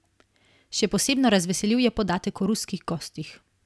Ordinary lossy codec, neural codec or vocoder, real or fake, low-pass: none; none; real; none